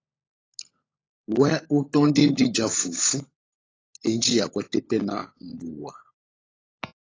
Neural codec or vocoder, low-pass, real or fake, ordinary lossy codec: codec, 16 kHz, 16 kbps, FunCodec, trained on LibriTTS, 50 frames a second; 7.2 kHz; fake; AAC, 32 kbps